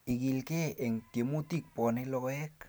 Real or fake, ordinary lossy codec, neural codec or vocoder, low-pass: real; none; none; none